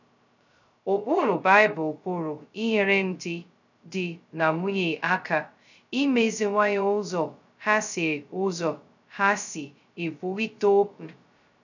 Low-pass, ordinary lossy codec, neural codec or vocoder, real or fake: 7.2 kHz; none; codec, 16 kHz, 0.2 kbps, FocalCodec; fake